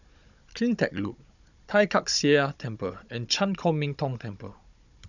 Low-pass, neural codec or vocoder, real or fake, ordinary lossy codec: 7.2 kHz; codec, 16 kHz, 16 kbps, FunCodec, trained on Chinese and English, 50 frames a second; fake; none